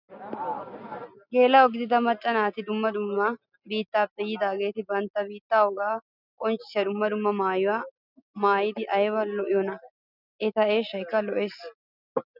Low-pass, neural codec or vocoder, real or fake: 5.4 kHz; none; real